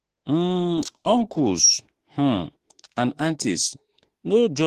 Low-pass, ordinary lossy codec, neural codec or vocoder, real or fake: 14.4 kHz; Opus, 16 kbps; autoencoder, 48 kHz, 32 numbers a frame, DAC-VAE, trained on Japanese speech; fake